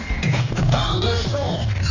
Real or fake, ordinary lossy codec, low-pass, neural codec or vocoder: fake; none; 7.2 kHz; codec, 44.1 kHz, 2.6 kbps, DAC